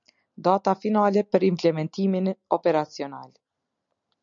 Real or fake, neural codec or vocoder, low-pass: real; none; 7.2 kHz